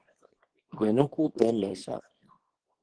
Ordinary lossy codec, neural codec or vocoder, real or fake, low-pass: Opus, 16 kbps; codec, 24 kHz, 0.9 kbps, WavTokenizer, small release; fake; 9.9 kHz